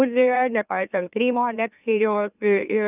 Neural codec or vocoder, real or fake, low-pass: autoencoder, 44.1 kHz, a latent of 192 numbers a frame, MeloTTS; fake; 3.6 kHz